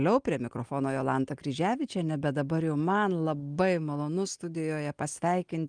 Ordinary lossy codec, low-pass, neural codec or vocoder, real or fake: Opus, 32 kbps; 9.9 kHz; none; real